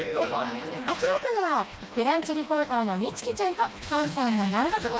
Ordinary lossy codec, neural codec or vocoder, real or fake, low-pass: none; codec, 16 kHz, 1 kbps, FreqCodec, smaller model; fake; none